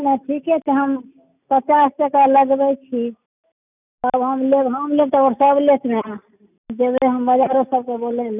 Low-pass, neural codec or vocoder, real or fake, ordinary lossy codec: 3.6 kHz; none; real; none